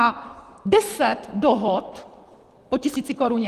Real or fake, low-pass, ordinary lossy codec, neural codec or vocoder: fake; 14.4 kHz; Opus, 24 kbps; vocoder, 44.1 kHz, 128 mel bands, Pupu-Vocoder